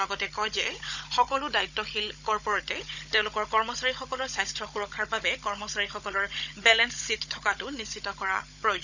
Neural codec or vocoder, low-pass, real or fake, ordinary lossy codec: codec, 16 kHz, 16 kbps, FunCodec, trained on Chinese and English, 50 frames a second; 7.2 kHz; fake; none